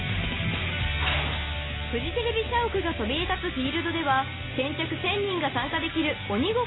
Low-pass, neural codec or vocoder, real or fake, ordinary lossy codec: 7.2 kHz; none; real; AAC, 16 kbps